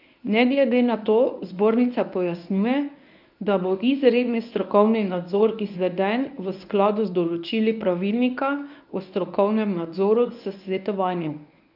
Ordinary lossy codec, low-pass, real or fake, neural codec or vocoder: none; 5.4 kHz; fake; codec, 24 kHz, 0.9 kbps, WavTokenizer, medium speech release version 1